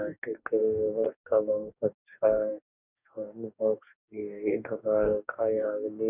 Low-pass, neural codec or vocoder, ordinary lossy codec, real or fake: 3.6 kHz; codec, 44.1 kHz, 2.6 kbps, DAC; none; fake